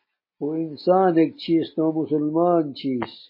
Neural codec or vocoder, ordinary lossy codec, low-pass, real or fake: none; MP3, 24 kbps; 5.4 kHz; real